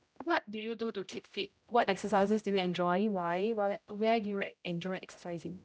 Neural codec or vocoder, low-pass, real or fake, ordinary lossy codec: codec, 16 kHz, 0.5 kbps, X-Codec, HuBERT features, trained on general audio; none; fake; none